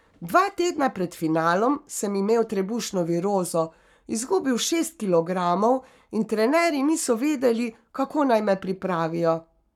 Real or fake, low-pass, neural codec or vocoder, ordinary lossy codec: fake; 19.8 kHz; codec, 44.1 kHz, 7.8 kbps, Pupu-Codec; none